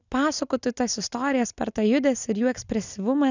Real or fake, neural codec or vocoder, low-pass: real; none; 7.2 kHz